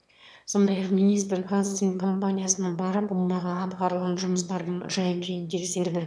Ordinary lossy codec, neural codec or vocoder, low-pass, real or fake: none; autoencoder, 22.05 kHz, a latent of 192 numbers a frame, VITS, trained on one speaker; none; fake